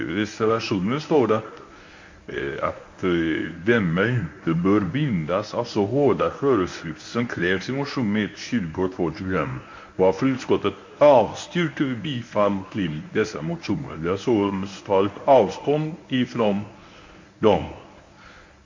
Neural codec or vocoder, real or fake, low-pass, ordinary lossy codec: codec, 24 kHz, 0.9 kbps, WavTokenizer, medium speech release version 1; fake; 7.2 kHz; MP3, 48 kbps